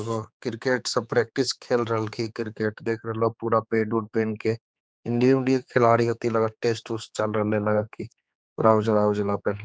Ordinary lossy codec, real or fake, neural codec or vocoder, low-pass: none; fake; codec, 16 kHz, 4 kbps, X-Codec, HuBERT features, trained on general audio; none